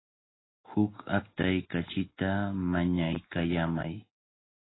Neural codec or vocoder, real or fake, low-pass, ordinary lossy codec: none; real; 7.2 kHz; AAC, 16 kbps